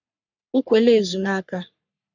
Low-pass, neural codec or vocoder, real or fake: 7.2 kHz; codec, 44.1 kHz, 3.4 kbps, Pupu-Codec; fake